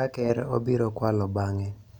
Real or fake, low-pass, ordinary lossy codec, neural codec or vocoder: real; 19.8 kHz; none; none